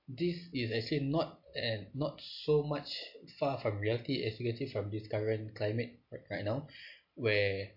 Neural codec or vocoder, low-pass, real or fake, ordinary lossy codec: none; 5.4 kHz; real; MP3, 32 kbps